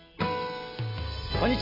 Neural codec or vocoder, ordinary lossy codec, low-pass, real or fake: none; MP3, 24 kbps; 5.4 kHz; real